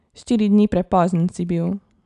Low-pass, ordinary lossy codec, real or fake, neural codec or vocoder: 10.8 kHz; none; real; none